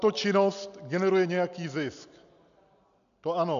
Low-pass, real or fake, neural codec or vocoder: 7.2 kHz; real; none